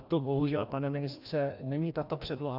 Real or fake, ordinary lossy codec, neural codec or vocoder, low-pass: fake; Opus, 64 kbps; codec, 16 kHz, 1 kbps, FreqCodec, larger model; 5.4 kHz